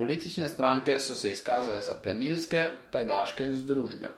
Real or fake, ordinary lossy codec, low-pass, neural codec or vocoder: fake; MP3, 64 kbps; 19.8 kHz; codec, 44.1 kHz, 2.6 kbps, DAC